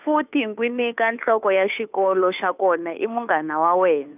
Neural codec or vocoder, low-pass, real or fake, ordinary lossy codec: codec, 16 kHz, 2 kbps, FunCodec, trained on Chinese and English, 25 frames a second; 3.6 kHz; fake; none